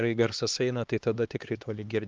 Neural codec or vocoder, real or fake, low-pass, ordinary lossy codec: codec, 16 kHz, 2 kbps, X-Codec, HuBERT features, trained on LibriSpeech; fake; 7.2 kHz; Opus, 32 kbps